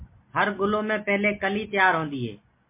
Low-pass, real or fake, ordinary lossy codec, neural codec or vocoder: 3.6 kHz; real; MP3, 24 kbps; none